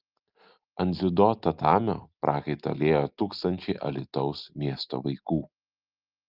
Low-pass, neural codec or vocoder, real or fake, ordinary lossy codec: 5.4 kHz; none; real; Opus, 24 kbps